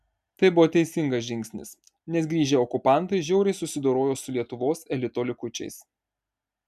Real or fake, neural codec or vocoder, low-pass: real; none; 14.4 kHz